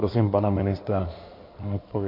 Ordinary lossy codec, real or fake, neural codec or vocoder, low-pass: MP3, 32 kbps; fake; codec, 16 kHz in and 24 kHz out, 2.2 kbps, FireRedTTS-2 codec; 5.4 kHz